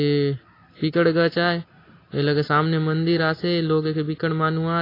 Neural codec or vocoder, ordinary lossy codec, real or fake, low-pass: none; AAC, 24 kbps; real; 5.4 kHz